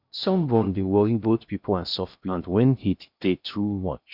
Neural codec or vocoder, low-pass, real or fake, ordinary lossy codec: codec, 16 kHz in and 24 kHz out, 0.6 kbps, FocalCodec, streaming, 2048 codes; 5.4 kHz; fake; none